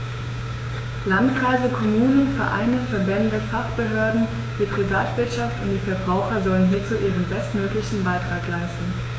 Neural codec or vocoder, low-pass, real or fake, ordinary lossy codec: codec, 16 kHz, 6 kbps, DAC; none; fake; none